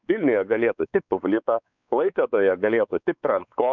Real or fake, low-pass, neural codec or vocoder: fake; 7.2 kHz; codec, 16 kHz, 4 kbps, X-Codec, WavLM features, trained on Multilingual LibriSpeech